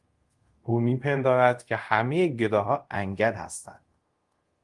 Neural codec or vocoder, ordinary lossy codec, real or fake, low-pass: codec, 24 kHz, 0.5 kbps, DualCodec; Opus, 24 kbps; fake; 10.8 kHz